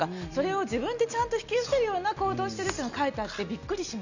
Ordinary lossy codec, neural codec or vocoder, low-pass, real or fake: none; none; 7.2 kHz; real